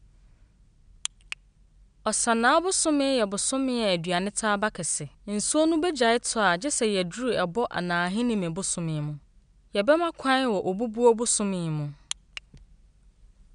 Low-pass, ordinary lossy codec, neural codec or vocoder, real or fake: 9.9 kHz; none; none; real